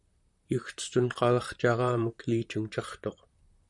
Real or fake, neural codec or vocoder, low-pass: fake; vocoder, 44.1 kHz, 128 mel bands, Pupu-Vocoder; 10.8 kHz